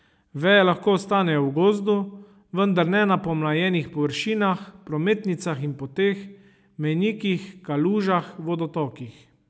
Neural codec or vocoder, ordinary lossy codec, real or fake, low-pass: none; none; real; none